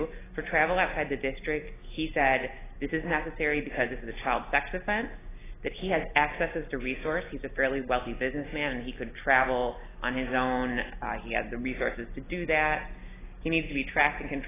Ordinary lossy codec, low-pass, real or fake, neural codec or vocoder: AAC, 16 kbps; 3.6 kHz; real; none